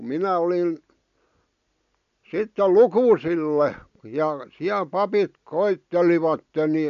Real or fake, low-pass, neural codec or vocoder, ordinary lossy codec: real; 7.2 kHz; none; none